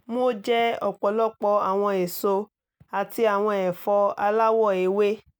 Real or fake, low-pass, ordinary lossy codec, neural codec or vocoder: real; none; none; none